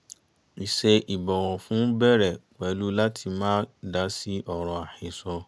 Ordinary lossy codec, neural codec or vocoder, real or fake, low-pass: none; none; real; none